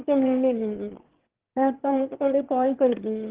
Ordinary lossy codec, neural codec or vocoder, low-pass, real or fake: Opus, 16 kbps; autoencoder, 22.05 kHz, a latent of 192 numbers a frame, VITS, trained on one speaker; 3.6 kHz; fake